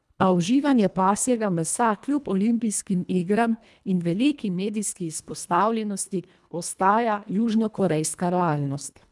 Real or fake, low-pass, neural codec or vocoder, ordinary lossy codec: fake; none; codec, 24 kHz, 1.5 kbps, HILCodec; none